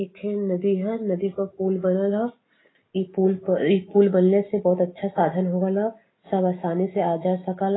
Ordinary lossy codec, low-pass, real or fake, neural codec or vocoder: AAC, 16 kbps; 7.2 kHz; real; none